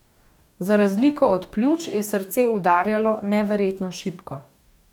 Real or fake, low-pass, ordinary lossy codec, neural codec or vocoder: fake; 19.8 kHz; none; codec, 44.1 kHz, 2.6 kbps, DAC